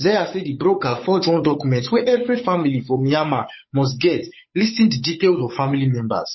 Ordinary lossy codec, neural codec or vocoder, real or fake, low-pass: MP3, 24 kbps; codec, 16 kHz, 8 kbps, FunCodec, trained on Chinese and English, 25 frames a second; fake; 7.2 kHz